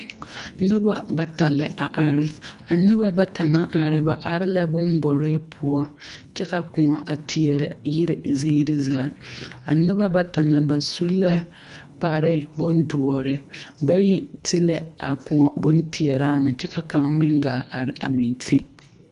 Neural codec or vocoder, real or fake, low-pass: codec, 24 kHz, 1.5 kbps, HILCodec; fake; 10.8 kHz